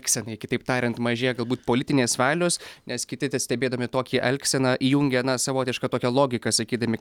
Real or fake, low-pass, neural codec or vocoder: real; 19.8 kHz; none